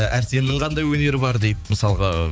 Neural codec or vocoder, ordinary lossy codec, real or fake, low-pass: codec, 16 kHz, 4 kbps, X-Codec, HuBERT features, trained on balanced general audio; none; fake; none